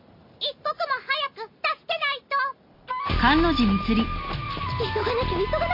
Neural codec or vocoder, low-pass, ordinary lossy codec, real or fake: none; 5.4 kHz; MP3, 32 kbps; real